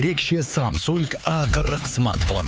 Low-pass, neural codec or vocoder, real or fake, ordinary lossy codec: none; codec, 16 kHz, 4 kbps, X-Codec, HuBERT features, trained on LibriSpeech; fake; none